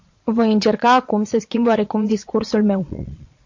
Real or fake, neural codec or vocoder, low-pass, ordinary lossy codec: fake; vocoder, 22.05 kHz, 80 mel bands, Vocos; 7.2 kHz; MP3, 48 kbps